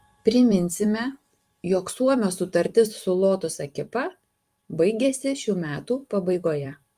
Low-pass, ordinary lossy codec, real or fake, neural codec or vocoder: 14.4 kHz; Opus, 32 kbps; fake; vocoder, 44.1 kHz, 128 mel bands every 256 samples, BigVGAN v2